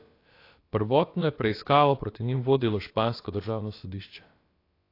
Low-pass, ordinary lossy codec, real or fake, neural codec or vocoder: 5.4 kHz; AAC, 32 kbps; fake; codec, 16 kHz, about 1 kbps, DyCAST, with the encoder's durations